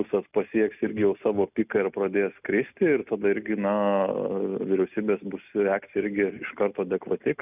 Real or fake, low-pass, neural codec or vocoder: real; 3.6 kHz; none